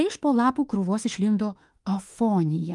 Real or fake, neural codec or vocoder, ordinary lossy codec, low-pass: fake; autoencoder, 48 kHz, 32 numbers a frame, DAC-VAE, trained on Japanese speech; Opus, 32 kbps; 10.8 kHz